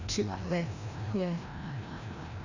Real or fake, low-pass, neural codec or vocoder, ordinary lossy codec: fake; 7.2 kHz; codec, 16 kHz, 1 kbps, FreqCodec, larger model; none